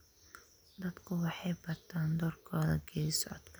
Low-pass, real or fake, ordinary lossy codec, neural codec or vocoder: none; real; none; none